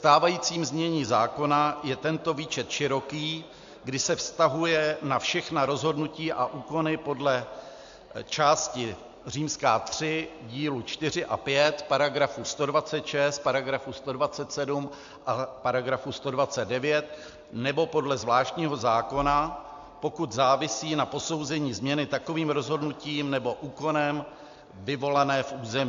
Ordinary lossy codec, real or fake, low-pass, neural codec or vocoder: AAC, 64 kbps; real; 7.2 kHz; none